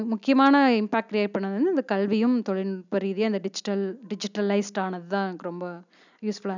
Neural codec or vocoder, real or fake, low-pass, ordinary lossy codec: none; real; 7.2 kHz; none